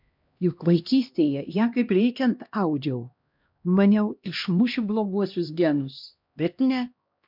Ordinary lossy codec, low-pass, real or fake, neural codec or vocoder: MP3, 48 kbps; 5.4 kHz; fake; codec, 16 kHz, 1 kbps, X-Codec, HuBERT features, trained on LibriSpeech